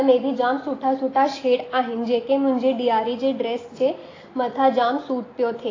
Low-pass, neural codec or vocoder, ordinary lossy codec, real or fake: 7.2 kHz; none; AAC, 32 kbps; real